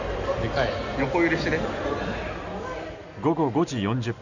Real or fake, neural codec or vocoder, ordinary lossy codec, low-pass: real; none; none; 7.2 kHz